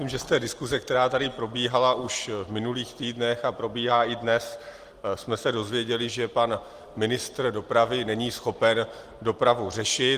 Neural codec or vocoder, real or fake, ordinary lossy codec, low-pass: none; real; Opus, 24 kbps; 14.4 kHz